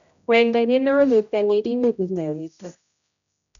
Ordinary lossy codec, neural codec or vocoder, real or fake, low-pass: none; codec, 16 kHz, 0.5 kbps, X-Codec, HuBERT features, trained on general audio; fake; 7.2 kHz